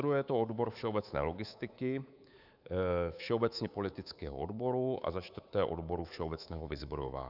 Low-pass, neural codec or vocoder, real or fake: 5.4 kHz; codec, 24 kHz, 3.1 kbps, DualCodec; fake